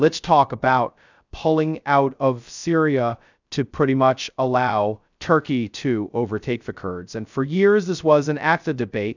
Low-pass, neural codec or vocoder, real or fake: 7.2 kHz; codec, 16 kHz, 0.2 kbps, FocalCodec; fake